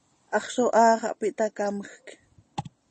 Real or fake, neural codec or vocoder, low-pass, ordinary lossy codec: real; none; 10.8 kHz; MP3, 32 kbps